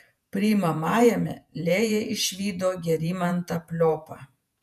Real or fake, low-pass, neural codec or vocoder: fake; 14.4 kHz; vocoder, 48 kHz, 128 mel bands, Vocos